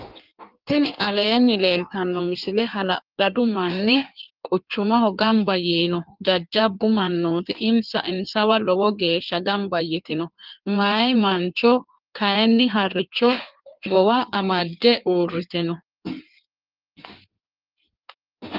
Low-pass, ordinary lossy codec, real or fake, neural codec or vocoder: 5.4 kHz; Opus, 32 kbps; fake; codec, 16 kHz in and 24 kHz out, 1.1 kbps, FireRedTTS-2 codec